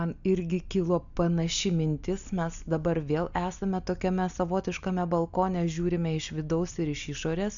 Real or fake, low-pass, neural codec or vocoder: real; 7.2 kHz; none